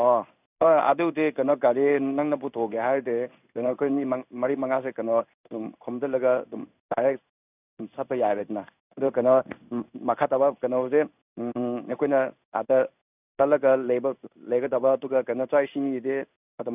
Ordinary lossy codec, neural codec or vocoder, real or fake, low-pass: none; codec, 16 kHz in and 24 kHz out, 1 kbps, XY-Tokenizer; fake; 3.6 kHz